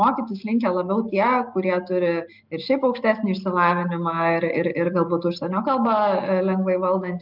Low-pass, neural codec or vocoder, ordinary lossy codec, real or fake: 5.4 kHz; none; Opus, 32 kbps; real